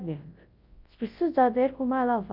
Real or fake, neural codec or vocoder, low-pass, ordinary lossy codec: fake; codec, 24 kHz, 0.9 kbps, WavTokenizer, large speech release; 5.4 kHz; Opus, 24 kbps